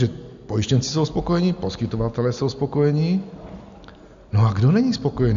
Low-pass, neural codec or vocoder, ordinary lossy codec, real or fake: 7.2 kHz; none; MP3, 64 kbps; real